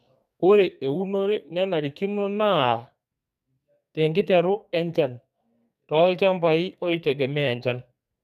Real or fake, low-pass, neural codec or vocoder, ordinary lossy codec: fake; 14.4 kHz; codec, 44.1 kHz, 2.6 kbps, SNAC; none